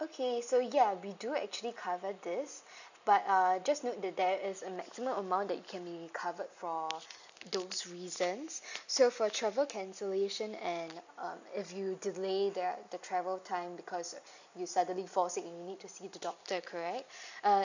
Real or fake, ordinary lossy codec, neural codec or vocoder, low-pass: real; none; none; 7.2 kHz